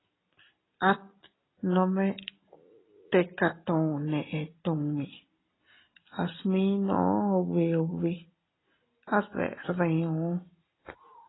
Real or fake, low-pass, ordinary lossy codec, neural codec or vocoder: real; 7.2 kHz; AAC, 16 kbps; none